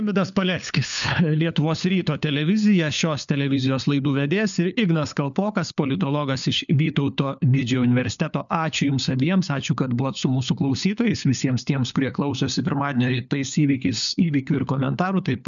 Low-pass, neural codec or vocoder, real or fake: 7.2 kHz; codec, 16 kHz, 4 kbps, FunCodec, trained on LibriTTS, 50 frames a second; fake